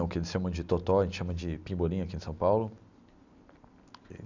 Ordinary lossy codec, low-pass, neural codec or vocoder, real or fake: none; 7.2 kHz; none; real